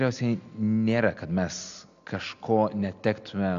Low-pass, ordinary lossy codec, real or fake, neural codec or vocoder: 7.2 kHz; AAC, 64 kbps; real; none